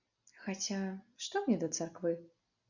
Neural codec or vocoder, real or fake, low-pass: none; real; 7.2 kHz